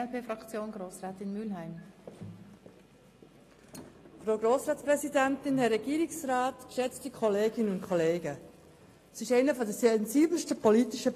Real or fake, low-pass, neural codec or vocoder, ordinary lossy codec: real; 14.4 kHz; none; AAC, 48 kbps